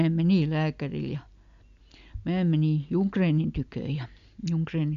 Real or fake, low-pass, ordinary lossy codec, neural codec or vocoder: real; 7.2 kHz; MP3, 64 kbps; none